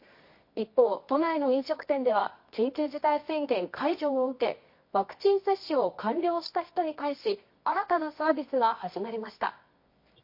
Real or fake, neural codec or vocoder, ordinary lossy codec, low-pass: fake; codec, 24 kHz, 0.9 kbps, WavTokenizer, medium music audio release; MP3, 32 kbps; 5.4 kHz